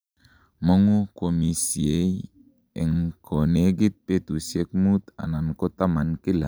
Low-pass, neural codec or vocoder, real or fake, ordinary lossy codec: none; none; real; none